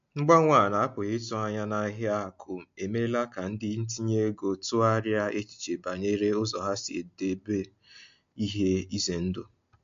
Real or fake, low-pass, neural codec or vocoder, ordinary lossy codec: real; 7.2 kHz; none; MP3, 48 kbps